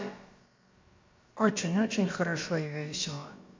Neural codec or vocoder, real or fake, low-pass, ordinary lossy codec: codec, 16 kHz, about 1 kbps, DyCAST, with the encoder's durations; fake; 7.2 kHz; MP3, 48 kbps